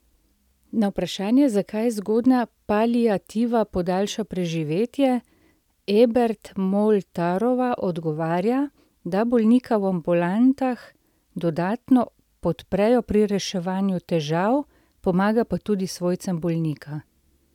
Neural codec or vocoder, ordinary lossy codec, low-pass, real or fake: none; none; 19.8 kHz; real